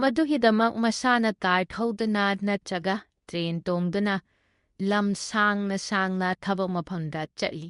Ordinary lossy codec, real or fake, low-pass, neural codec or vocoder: MP3, 64 kbps; fake; 10.8 kHz; codec, 24 kHz, 0.9 kbps, WavTokenizer, medium speech release version 2